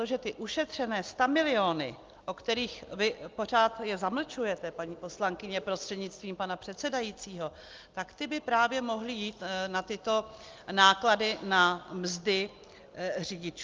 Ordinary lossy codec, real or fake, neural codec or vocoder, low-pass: Opus, 24 kbps; real; none; 7.2 kHz